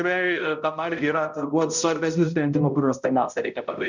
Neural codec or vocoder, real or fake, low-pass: codec, 16 kHz, 0.5 kbps, X-Codec, HuBERT features, trained on balanced general audio; fake; 7.2 kHz